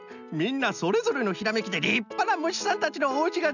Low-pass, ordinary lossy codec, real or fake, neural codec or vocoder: 7.2 kHz; none; real; none